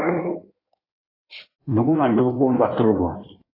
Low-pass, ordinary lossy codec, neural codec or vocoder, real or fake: 5.4 kHz; AAC, 24 kbps; codec, 16 kHz in and 24 kHz out, 1.1 kbps, FireRedTTS-2 codec; fake